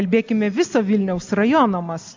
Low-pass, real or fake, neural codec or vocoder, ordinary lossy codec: 7.2 kHz; real; none; MP3, 64 kbps